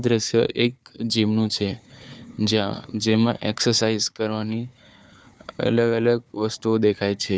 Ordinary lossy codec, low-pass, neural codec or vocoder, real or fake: none; none; codec, 16 kHz, 4 kbps, FunCodec, trained on Chinese and English, 50 frames a second; fake